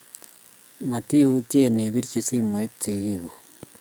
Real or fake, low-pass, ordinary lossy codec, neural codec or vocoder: fake; none; none; codec, 44.1 kHz, 2.6 kbps, SNAC